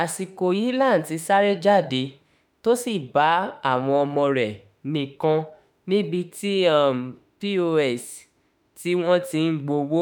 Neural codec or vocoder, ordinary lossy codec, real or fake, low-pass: autoencoder, 48 kHz, 32 numbers a frame, DAC-VAE, trained on Japanese speech; none; fake; none